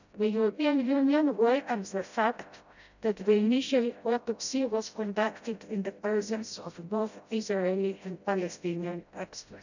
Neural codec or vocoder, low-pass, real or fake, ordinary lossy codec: codec, 16 kHz, 0.5 kbps, FreqCodec, smaller model; 7.2 kHz; fake; none